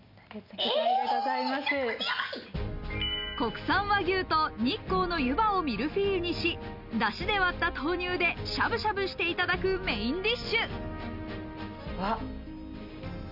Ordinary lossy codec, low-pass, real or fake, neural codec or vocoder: none; 5.4 kHz; real; none